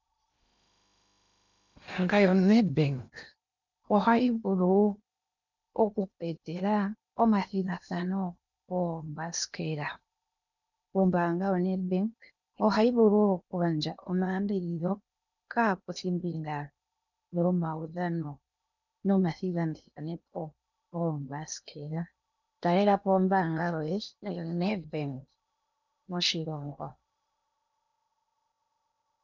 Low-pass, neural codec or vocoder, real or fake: 7.2 kHz; codec, 16 kHz in and 24 kHz out, 0.8 kbps, FocalCodec, streaming, 65536 codes; fake